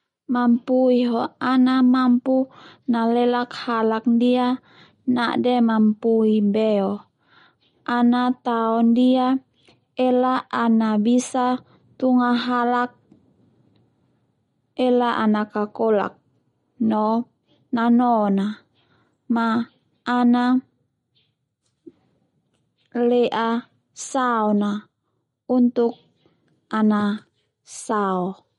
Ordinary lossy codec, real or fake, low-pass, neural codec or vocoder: MP3, 48 kbps; real; 19.8 kHz; none